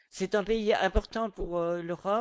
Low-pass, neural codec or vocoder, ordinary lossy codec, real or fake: none; codec, 16 kHz, 4.8 kbps, FACodec; none; fake